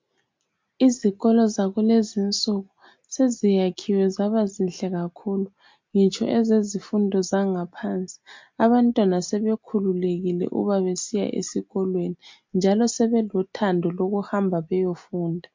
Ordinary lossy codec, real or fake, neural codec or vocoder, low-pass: MP3, 48 kbps; real; none; 7.2 kHz